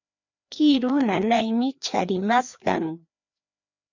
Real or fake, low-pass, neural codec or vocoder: fake; 7.2 kHz; codec, 16 kHz, 2 kbps, FreqCodec, larger model